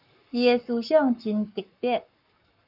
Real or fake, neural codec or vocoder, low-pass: fake; codec, 44.1 kHz, 7.8 kbps, Pupu-Codec; 5.4 kHz